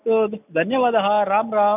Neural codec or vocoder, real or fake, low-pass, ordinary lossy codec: none; real; 3.6 kHz; none